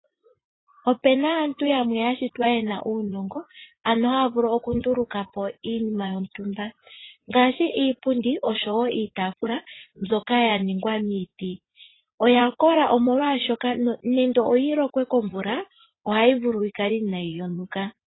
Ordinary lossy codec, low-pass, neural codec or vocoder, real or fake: AAC, 16 kbps; 7.2 kHz; vocoder, 44.1 kHz, 80 mel bands, Vocos; fake